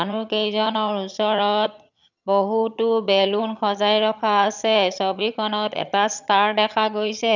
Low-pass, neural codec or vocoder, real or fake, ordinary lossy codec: 7.2 kHz; vocoder, 22.05 kHz, 80 mel bands, HiFi-GAN; fake; none